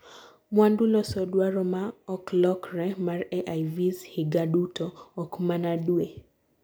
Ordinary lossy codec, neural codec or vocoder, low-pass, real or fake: none; none; none; real